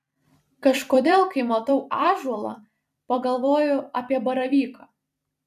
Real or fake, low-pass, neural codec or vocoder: fake; 14.4 kHz; vocoder, 44.1 kHz, 128 mel bands every 256 samples, BigVGAN v2